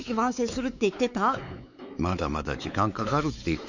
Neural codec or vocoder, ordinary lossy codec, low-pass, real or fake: codec, 16 kHz, 4 kbps, X-Codec, WavLM features, trained on Multilingual LibriSpeech; none; 7.2 kHz; fake